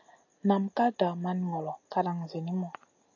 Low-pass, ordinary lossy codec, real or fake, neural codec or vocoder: 7.2 kHz; AAC, 48 kbps; real; none